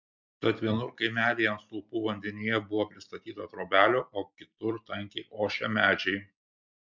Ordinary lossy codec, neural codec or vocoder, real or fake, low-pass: MP3, 64 kbps; none; real; 7.2 kHz